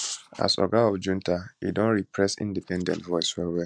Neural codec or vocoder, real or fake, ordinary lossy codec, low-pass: none; real; MP3, 96 kbps; 9.9 kHz